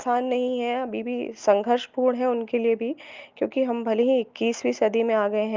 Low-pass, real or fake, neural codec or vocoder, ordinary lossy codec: 7.2 kHz; real; none; Opus, 24 kbps